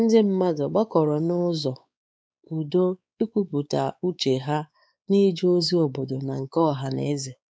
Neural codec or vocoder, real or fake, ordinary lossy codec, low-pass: codec, 16 kHz, 4 kbps, X-Codec, WavLM features, trained on Multilingual LibriSpeech; fake; none; none